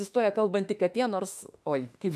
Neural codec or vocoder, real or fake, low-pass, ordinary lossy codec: autoencoder, 48 kHz, 32 numbers a frame, DAC-VAE, trained on Japanese speech; fake; 14.4 kHz; AAC, 96 kbps